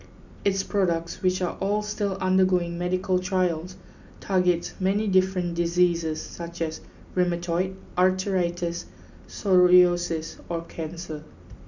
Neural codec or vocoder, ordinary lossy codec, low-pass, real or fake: none; none; 7.2 kHz; real